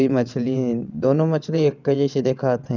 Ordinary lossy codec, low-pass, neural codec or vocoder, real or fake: none; 7.2 kHz; vocoder, 22.05 kHz, 80 mel bands, WaveNeXt; fake